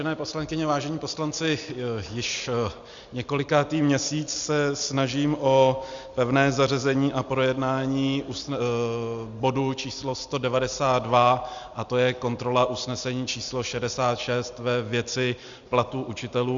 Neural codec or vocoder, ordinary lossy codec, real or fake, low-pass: none; Opus, 64 kbps; real; 7.2 kHz